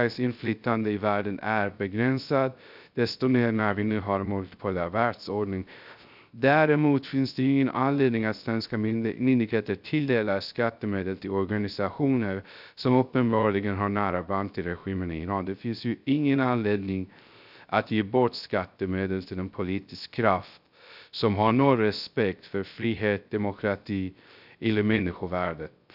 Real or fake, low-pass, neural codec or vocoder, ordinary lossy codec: fake; 5.4 kHz; codec, 16 kHz, 0.3 kbps, FocalCodec; none